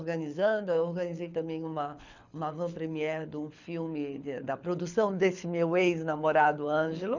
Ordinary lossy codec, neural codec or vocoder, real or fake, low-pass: none; codec, 24 kHz, 6 kbps, HILCodec; fake; 7.2 kHz